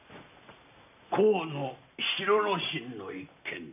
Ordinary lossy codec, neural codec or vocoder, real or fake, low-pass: none; none; real; 3.6 kHz